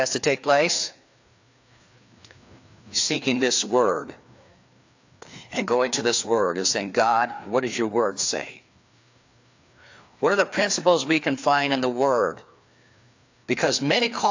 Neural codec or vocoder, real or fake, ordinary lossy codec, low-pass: codec, 16 kHz, 2 kbps, FreqCodec, larger model; fake; AAC, 48 kbps; 7.2 kHz